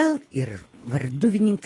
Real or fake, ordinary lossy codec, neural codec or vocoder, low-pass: fake; AAC, 32 kbps; codec, 24 kHz, 3 kbps, HILCodec; 10.8 kHz